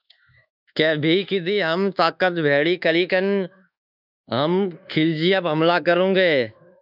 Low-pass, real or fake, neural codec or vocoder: 5.4 kHz; fake; codec, 24 kHz, 1.2 kbps, DualCodec